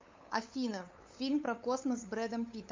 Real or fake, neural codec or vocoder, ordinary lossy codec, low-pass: fake; codec, 16 kHz, 8 kbps, FunCodec, trained on LibriTTS, 25 frames a second; MP3, 48 kbps; 7.2 kHz